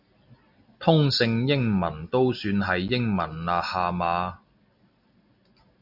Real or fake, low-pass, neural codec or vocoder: real; 5.4 kHz; none